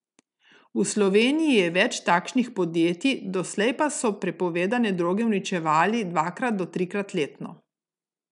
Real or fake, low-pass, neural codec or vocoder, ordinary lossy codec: real; 10.8 kHz; none; none